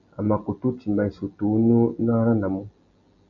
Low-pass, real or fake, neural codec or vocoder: 7.2 kHz; real; none